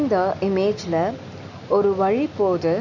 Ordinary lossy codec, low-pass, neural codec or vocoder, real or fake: none; 7.2 kHz; none; real